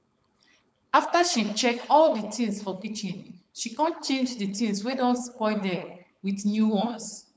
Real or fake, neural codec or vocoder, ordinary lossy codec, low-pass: fake; codec, 16 kHz, 4.8 kbps, FACodec; none; none